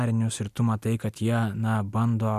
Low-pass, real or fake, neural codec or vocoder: 14.4 kHz; real; none